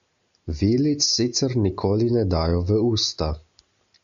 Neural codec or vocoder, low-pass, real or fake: none; 7.2 kHz; real